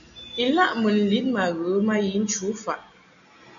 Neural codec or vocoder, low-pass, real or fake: none; 7.2 kHz; real